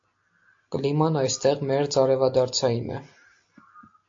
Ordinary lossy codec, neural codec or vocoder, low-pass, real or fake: AAC, 48 kbps; none; 7.2 kHz; real